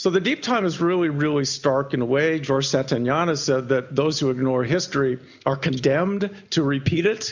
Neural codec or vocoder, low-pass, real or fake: none; 7.2 kHz; real